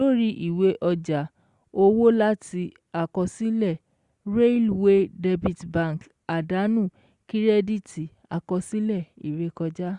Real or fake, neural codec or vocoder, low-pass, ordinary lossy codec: real; none; 10.8 kHz; Opus, 64 kbps